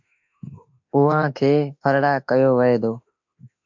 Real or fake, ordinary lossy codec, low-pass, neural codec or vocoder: fake; MP3, 64 kbps; 7.2 kHz; autoencoder, 48 kHz, 32 numbers a frame, DAC-VAE, trained on Japanese speech